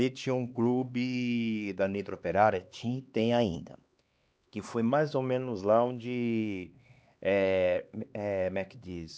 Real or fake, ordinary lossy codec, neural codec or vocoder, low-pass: fake; none; codec, 16 kHz, 2 kbps, X-Codec, HuBERT features, trained on LibriSpeech; none